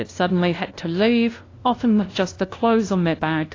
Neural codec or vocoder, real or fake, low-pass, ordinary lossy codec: codec, 16 kHz, 0.5 kbps, FunCodec, trained on LibriTTS, 25 frames a second; fake; 7.2 kHz; AAC, 32 kbps